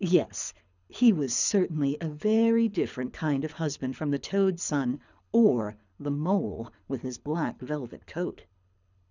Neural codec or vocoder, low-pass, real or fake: codec, 24 kHz, 6 kbps, HILCodec; 7.2 kHz; fake